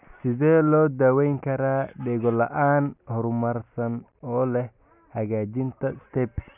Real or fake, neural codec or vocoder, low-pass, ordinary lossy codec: real; none; 3.6 kHz; none